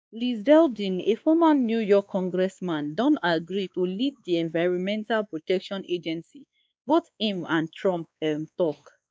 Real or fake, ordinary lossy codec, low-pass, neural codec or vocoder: fake; none; none; codec, 16 kHz, 2 kbps, X-Codec, WavLM features, trained on Multilingual LibriSpeech